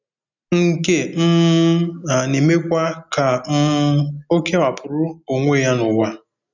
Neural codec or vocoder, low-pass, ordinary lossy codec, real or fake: none; 7.2 kHz; none; real